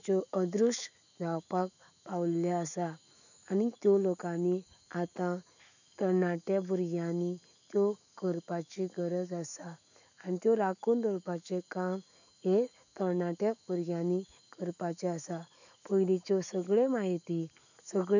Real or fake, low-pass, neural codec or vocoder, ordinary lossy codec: fake; 7.2 kHz; autoencoder, 48 kHz, 128 numbers a frame, DAC-VAE, trained on Japanese speech; none